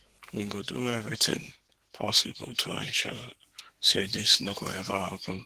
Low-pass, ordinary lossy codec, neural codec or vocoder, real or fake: 14.4 kHz; Opus, 16 kbps; codec, 32 kHz, 1.9 kbps, SNAC; fake